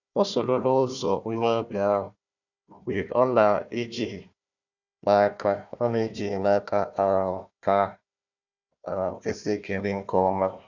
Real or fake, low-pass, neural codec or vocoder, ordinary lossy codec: fake; 7.2 kHz; codec, 16 kHz, 1 kbps, FunCodec, trained on Chinese and English, 50 frames a second; none